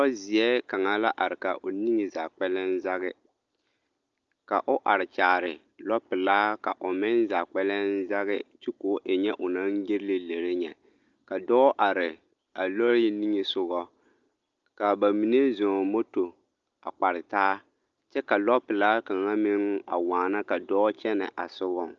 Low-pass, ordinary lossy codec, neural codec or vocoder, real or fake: 7.2 kHz; Opus, 24 kbps; none; real